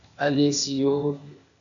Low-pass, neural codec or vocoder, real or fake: 7.2 kHz; codec, 16 kHz, 0.8 kbps, ZipCodec; fake